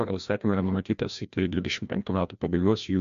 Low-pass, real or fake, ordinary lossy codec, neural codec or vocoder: 7.2 kHz; fake; MP3, 64 kbps; codec, 16 kHz, 1 kbps, FreqCodec, larger model